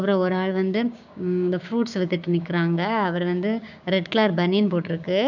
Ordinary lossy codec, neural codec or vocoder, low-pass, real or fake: none; codec, 16 kHz, 6 kbps, DAC; 7.2 kHz; fake